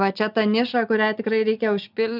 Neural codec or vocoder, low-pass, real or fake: none; 5.4 kHz; real